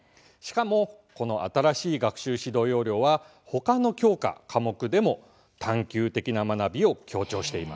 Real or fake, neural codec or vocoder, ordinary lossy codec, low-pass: real; none; none; none